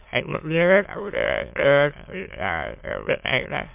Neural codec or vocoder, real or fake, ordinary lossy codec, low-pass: autoencoder, 22.05 kHz, a latent of 192 numbers a frame, VITS, trained on many speakers; fake; MP3, 32 kbps; 3.6 kHz